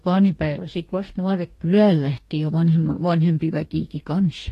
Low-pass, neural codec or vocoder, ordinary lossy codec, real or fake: 14.4 kHz; codec, 44.1 kHz, 2.6 kbps, DAC; AAC, 48 kbps; fake